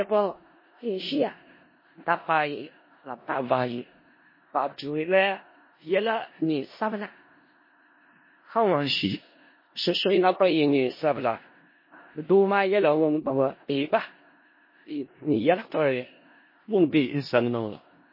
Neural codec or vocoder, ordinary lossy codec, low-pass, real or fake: codec, 16 kHz in and 24 kHz out, 0.4 kbps, LongCat-Audio-Codec, four codebook decoder; MP3, 24 kbps; 5.4 kHz; fake